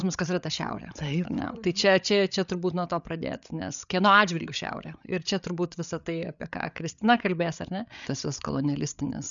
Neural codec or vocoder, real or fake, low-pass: codec, 16 kHz, 8 kbps, FreqCodec, larger model; fake; 7.2 kHz